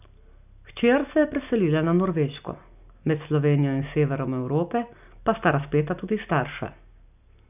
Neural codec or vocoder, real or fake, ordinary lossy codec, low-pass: none; real; none; 3.6 kHz